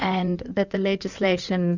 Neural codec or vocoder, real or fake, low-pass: codec, 16 kHz in and 24 kHz out, 2.2 kbps, FireRedTTS-2 codec; fake; 7.2 kHz